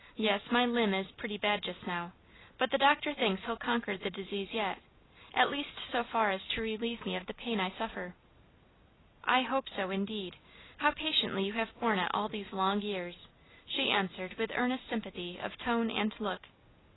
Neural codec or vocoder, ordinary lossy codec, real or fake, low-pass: none; AAC, 16 kbps; real; 7.2 kHz